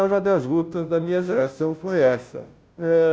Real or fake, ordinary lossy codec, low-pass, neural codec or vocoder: fake; none; none; codec, 16 kHz, 0.5 kbps, FunCodec, trained on Chinese and English, 25 frames a second